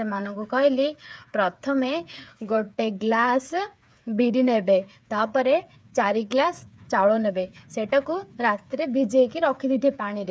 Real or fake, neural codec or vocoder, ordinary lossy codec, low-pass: fake; codec, 16 kHz, 8 kbps, FreqCodec, smaller model; none; none